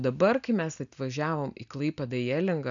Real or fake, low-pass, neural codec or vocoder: real; 7.2 kHz; none